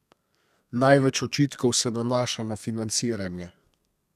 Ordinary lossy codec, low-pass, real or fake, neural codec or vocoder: none; 14.4 kHz; fake; codec, 32 kHz, 1.9 kbps, SNAC